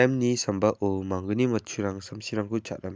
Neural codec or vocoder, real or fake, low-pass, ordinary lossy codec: none; real; none; none